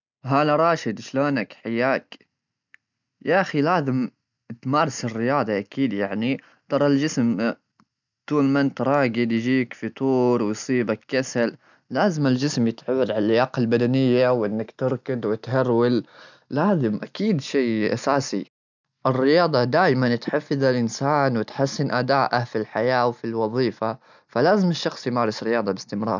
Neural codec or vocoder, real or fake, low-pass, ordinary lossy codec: none; real; 7.2 kHz; none